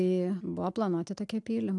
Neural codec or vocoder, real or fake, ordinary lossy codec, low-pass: none; real; AAC, 64 kbps; 10.8 kHz